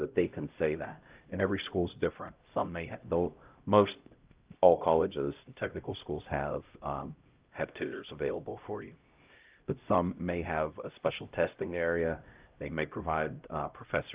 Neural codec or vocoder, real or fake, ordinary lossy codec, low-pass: codec, 16 kHz, 0.5 kbps, X-Codec, HuBERT features, trained on LibriSpeech; fake; Opus, 32 kbps; 3.6 kHz